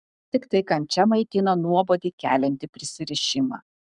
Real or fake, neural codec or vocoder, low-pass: fake; codec, 44.1 kHz, 7.8 kbps, Pupu-Codec; 10.8 kHz